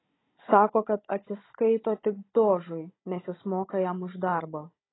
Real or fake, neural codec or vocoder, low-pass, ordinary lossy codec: fake; codec, 16 kHz, 16 kbps, FunCodec, trained on Chinese and English, 50 frames a second; 7.2 kHz; AAC, 16 kbps